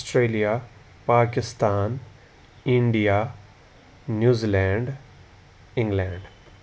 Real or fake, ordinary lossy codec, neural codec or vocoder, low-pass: real; none; none; none